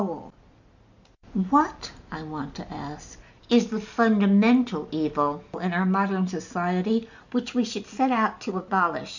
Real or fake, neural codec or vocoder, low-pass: real; none; 7.2 kHz